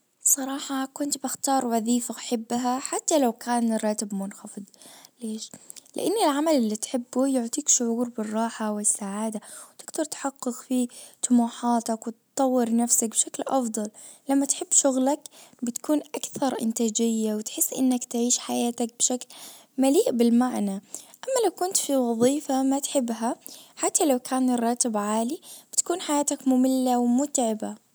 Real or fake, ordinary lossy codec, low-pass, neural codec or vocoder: real; none; none; none